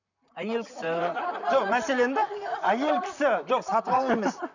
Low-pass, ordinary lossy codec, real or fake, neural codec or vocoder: 7.2 kHz; none; fake; vocoder, 44.1 kHz, 128 mel bands, Pupu-Vocoder